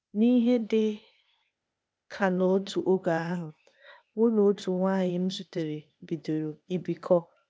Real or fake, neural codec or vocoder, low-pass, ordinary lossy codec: fake; codec, 16 kHz, 0.8 kbps, ZipCodec; none; none